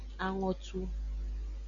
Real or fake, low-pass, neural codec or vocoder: real; 7.2 kHz; none